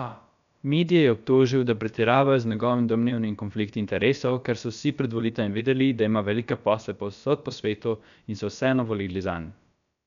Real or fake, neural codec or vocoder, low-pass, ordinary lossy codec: fake; codec, 16 kHz, about 1 kbps, DyCAST, with the encoder's durations; 7.2 kHz; none